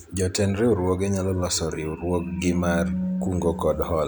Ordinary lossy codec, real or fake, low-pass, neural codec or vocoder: none; real; none; none